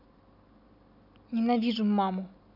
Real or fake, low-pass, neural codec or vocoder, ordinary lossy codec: real; 5.4 kHz; none; none